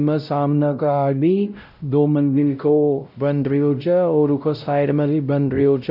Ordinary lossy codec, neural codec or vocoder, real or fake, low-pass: none; codec, 16 kHz, 0.5 kbps, X-Codec, WavLM features, trained on Multilingual LibriSpeech; fake; 5.4 kHz